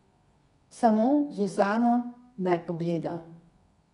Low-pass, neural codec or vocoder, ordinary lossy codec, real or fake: 10.8 kHz; codec, 24 kHz, 0.9 kbps, WavTokenizer, medium music audio release; none; fake